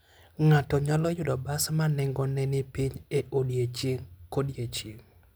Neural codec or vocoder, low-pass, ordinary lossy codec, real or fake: none; none; none; real